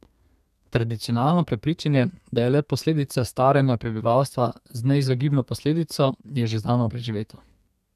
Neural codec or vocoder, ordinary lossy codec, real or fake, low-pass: codec, 32 kHz, 1.9 kbps, SNAC; none; fake; 14.4 kHz